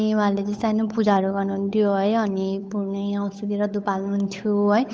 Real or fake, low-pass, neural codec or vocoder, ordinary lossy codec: fake; none; codec, 16 kHz, 8 kbps, FunCodec, trained on Chinese and English, 25 frames a second; none